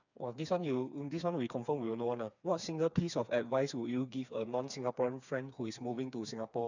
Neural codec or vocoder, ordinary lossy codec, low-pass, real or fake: codec, 16 kHz, 4 kbps, FreqCodec, smaller model; none; 7.2 kHz; fake